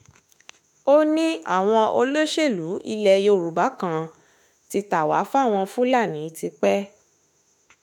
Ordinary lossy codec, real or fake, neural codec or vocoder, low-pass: none; fake; autoencoder, 48 kHz, 32 numbers a frame, DAC-VAE, trained on Japanese speech; 19.8 kHz